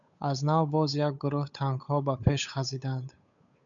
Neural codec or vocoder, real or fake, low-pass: codec, 16 kHz, 8 kbps, FunCodec, trained on Chinese and English, 25 frames a second; fake; 7.2 kHz